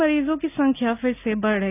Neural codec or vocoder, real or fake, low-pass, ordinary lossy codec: none; real; 3.6 kHz; none